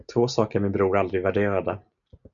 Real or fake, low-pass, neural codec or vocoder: real; 7.2 kHz; none